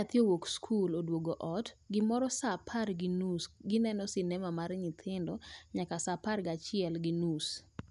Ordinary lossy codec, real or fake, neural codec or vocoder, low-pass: none; real; none; 10.8 kHz